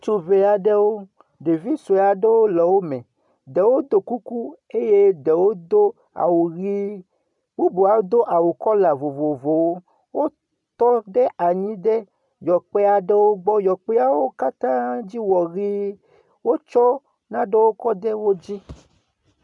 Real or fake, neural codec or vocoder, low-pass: real; none; 10.8 kHz